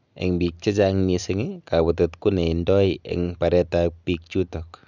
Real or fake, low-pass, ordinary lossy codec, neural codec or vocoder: real; 7.2 kHz; none; none